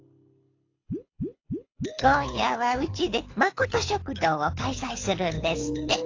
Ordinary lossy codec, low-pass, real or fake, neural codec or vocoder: AAC, 32 kbps; 7.2 kHz; fake; codec, 24 kHz, 6 kbps, HILCodec